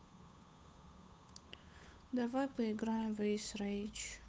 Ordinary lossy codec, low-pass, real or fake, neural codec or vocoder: none; none; fake; codec, 16 kHz, 8 kbps, FunCodec, trained on Chinese and English, 25 frames a second